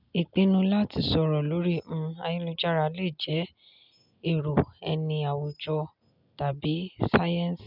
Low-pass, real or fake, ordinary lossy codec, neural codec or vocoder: 5.4 kHz; real; none; none